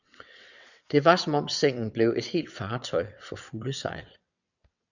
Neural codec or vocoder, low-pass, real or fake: vocoder, 22.05 kHz, 80 mel bands, WaveNeXt; 7.2 kHz; fake